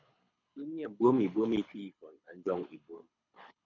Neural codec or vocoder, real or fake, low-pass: codec, 24 kHz, 6 kbps, HILCodec; fake; 7.2 kHz